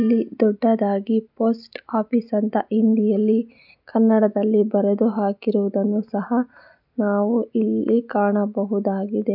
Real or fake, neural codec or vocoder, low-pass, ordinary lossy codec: real; none; 5.4 kHz; none